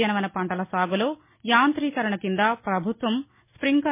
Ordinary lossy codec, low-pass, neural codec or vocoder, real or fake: MP3, 24 kbps; 3.6 kHz; none; real